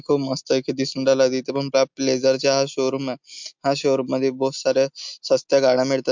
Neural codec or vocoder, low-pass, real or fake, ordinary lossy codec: none; 7.2 kHz; real; MP3, 64 kbps